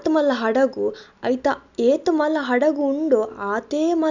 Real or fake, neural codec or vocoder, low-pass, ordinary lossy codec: real; none; 7.2 kHz; none